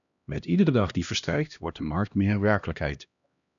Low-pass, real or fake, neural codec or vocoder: 7.2 kHz; fake; codec, 16 kHz, 1 kbps, X-Codec, HuBERT features, trained on LibriSpeech